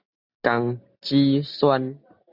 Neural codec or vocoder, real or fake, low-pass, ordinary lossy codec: none; real; 5.4 kHz; Opus, 64 kbps